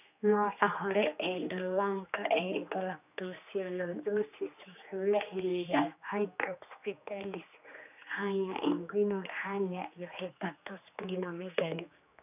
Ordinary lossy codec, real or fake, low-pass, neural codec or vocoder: none; fake; 3.6 kHz; codec, 16 kHz, 2 kbps, X-Codec, HuBERT features, trained on general audio